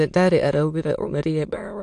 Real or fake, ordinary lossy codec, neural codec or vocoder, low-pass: fake; AAC, 64 kbps; autoencoder, 22.05 kHz, a latent of 192 numbers a frame, VITS, trained on many speakers; 9.9 kHz